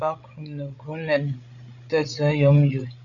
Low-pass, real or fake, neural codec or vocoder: 7.2 kHz; fake; codec, 16 kHz, 16 kbps, FreqCodec, larger model